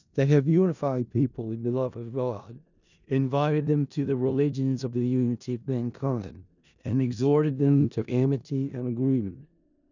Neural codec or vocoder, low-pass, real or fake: codec, 16 kHz in and 24 kHz out, 0.4 kbps, LongCat-Audio-Codec, four codebook decoder; 7.2 kHz; fake